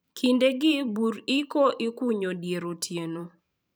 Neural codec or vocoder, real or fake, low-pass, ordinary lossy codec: vocoder, 44.1 kHz, 128 mel bands every 512 samples, BigVGAN v2; fake; none; none